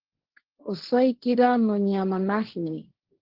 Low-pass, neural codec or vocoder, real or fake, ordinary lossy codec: 5.4 kHz; codec, 16 kHz, 1.1 kbps, Voila-Tokenizer; fake; Opus, 16 kbps